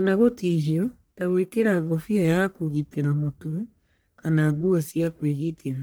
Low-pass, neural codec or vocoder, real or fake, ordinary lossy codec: none; codec, 44.1 kHz, 1.7 kbps, Pupu-Codec; fake; none